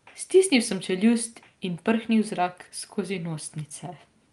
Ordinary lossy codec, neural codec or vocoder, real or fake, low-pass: Opus, 32 kbps; none; real; 10.8 kHz